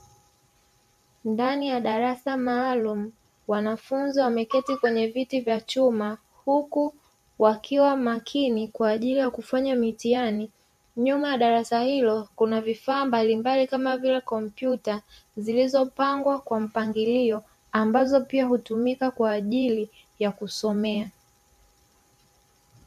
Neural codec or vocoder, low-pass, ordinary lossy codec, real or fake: vocoder, 44.1 kHz, 128 mel bands every 512 samples, BigVGAN v2; 14.4 kHz; MP3, 64 kbps; fake